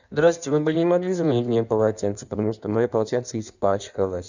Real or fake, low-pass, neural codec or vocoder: fake; 7.2 kHz; codec, 16 kHz in and 24 kHz out, 1.1 kbps, FireRedTTS-2 codec